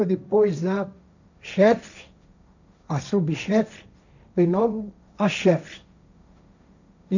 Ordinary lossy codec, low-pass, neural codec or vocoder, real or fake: none; 7.2 kHz; codec, 16 kHz, 1.1 kbps, Voila-Tokenizer; fake